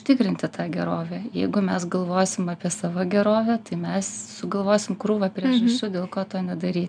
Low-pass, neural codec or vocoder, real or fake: 9.9 kHz; none; real